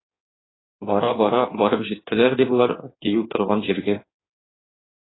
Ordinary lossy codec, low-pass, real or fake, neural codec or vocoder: AAC, 16 kbps; 7.2 kHz; fake; codec, 16 kHz in and 24 kHz out, 1.1 kbps, FireRedTTS-2 codec